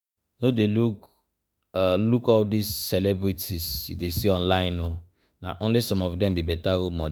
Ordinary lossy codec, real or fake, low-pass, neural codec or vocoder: none; fake; none; autoencoder, 48 kHz, 32 numbers a frame, DAC-VAE, trained on Japanese speech